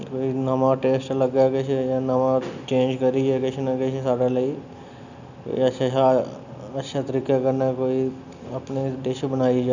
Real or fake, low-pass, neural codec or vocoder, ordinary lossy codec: real; 7.2 kHz; none; none